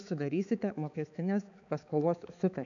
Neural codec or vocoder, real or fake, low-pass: codec, 16 kHz, 4 kbps, X-Codec, HuBERT features, trained on balanced general audio; fake; 7.2 kHz